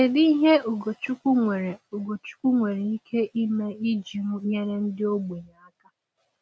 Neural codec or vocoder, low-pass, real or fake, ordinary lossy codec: none; none; real; none